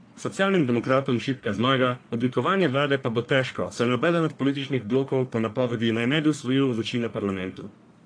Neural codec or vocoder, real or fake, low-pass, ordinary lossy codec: codec, 44.1 kHz, 1.7 kbps, Pupu-Codec; fake; 9.9 kHz; AAC, 48 kbps